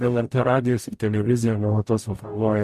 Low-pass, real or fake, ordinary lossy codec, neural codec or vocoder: 14.4 kHz; fake; MP3, 64 kbps; codec, 44.1 kHz, 0.9 kbps, DAC